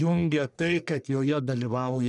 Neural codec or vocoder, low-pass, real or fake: codec, 44.1 kHz, 1.7 kbps, Pupu-Codec; 10.8 kHz; fake